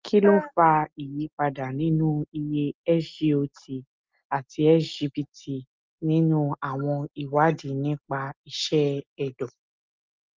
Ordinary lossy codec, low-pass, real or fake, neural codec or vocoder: Opus, 24 kbps; 7.2 kHz; real; none